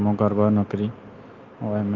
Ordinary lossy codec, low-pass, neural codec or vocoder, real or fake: Opus, 32 kbps; 7.2 kHz; none; real